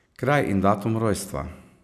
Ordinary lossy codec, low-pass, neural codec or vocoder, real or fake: none; 14.4 kHz; none; real